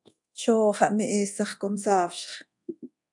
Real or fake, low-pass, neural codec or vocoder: fake; 10.8 kHz; codec, 24 kHz, 0.9 kbps, DualCodec